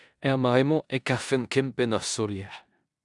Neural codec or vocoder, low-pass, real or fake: codec, 16 kHz in and 24 kHz out, 0.9 kbps, LongCat-Audio-Codec, four codebook decoder; 10.8 kHz; fake